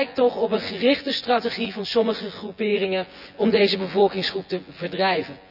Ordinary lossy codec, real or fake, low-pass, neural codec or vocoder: none; fake; 5.4 kHz; vocoder, 24 kHz, 100 mel bands, Vocos